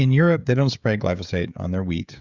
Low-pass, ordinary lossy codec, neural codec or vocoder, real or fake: 7.2 kHz; Opus, 64 kbps; codec, 16 kHz, 16 kbps, FreqCodec, smaller model; fake